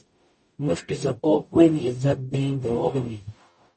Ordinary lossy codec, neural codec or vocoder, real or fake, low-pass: MP3, 32 kbps; codec, 44.1 kHz, 0.9 kbps, DAC; fake; 10.8 kHz